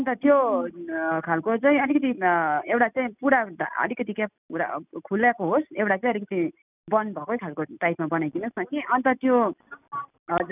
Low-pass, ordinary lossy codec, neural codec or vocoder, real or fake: 3.6 kHz; none; none; real